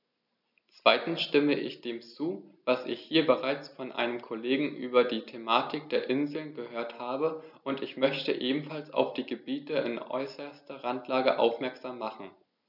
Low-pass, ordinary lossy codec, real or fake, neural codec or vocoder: 5.4 kHz; none; real; none